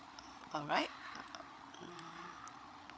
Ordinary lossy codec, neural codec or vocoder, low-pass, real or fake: none; codec, 16 kHz, 8 kbps, FreqCodec, larger model; none; fake